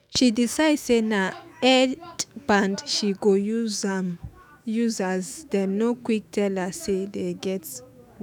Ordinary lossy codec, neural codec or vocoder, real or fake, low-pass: none; autoencoder, 48 kHz, 128 numbers a frame, DAC-VAE, trained on Japanese speech; fake; none